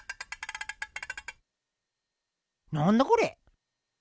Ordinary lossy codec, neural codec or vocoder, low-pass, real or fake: none; none; none; real